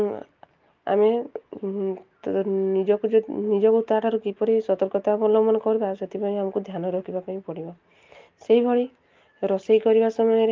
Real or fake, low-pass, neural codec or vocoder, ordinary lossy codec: real; 7.2 kHz; none; Opus, 24 kbps